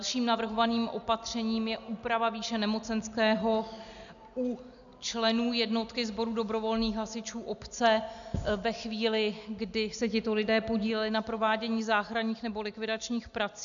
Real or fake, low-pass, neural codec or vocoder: real; 7.2 kHz; none